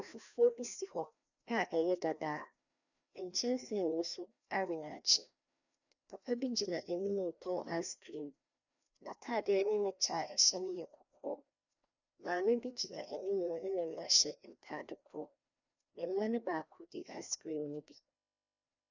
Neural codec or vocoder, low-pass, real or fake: codec, 16 kHz, 1 kbps, FreqCodec, larger model; 7.2 kHz; fake